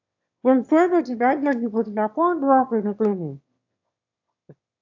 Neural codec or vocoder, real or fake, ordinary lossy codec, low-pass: autoencoder, 22.05 kHz, a latent of 192 numbers a frame, VITS, trained on one speaker; fake; AAC, 48 kbps; 7.2 kHz